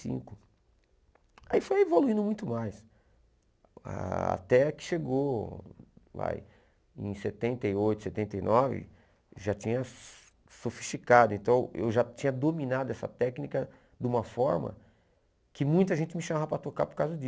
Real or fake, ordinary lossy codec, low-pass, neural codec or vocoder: real; none; none; none